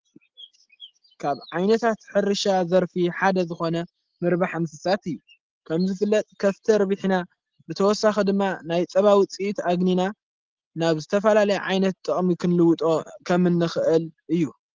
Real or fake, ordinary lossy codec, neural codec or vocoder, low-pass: real; Opus, 16 kbps; none; 7.2 kHz